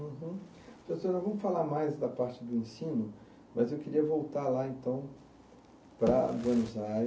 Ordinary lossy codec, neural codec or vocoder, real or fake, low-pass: none; none; real; none